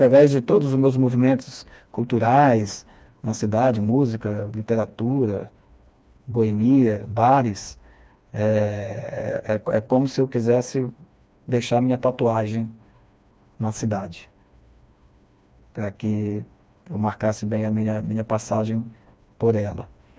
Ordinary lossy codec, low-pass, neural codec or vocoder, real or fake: none; none; codec, 16 kHz, 2 kbps, FreqCodec, smaller model; fake